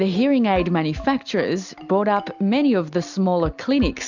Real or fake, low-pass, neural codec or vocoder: real; 7.2 kHz; none